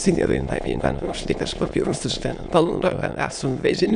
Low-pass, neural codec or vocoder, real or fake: 9.9 kHz; autoencoder, 22.05 kHz, a latent of 192 numbers a frame, VITS, trained on many speakers; fake